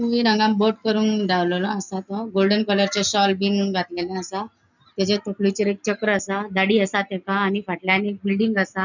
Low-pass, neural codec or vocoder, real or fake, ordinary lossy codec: 7.2 kHz; none; real; none